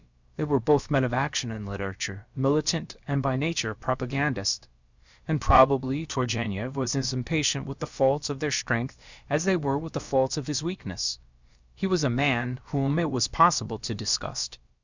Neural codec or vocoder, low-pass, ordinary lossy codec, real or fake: codec, 16 kHz, about 1 kbps, DyCAST, with the encoder's durations; 7.2 kHz; Opus, 64 kbps; fake